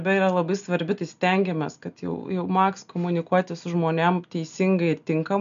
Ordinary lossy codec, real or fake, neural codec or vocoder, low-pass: MP3, 96 kbps; real; none; 7.2 kHz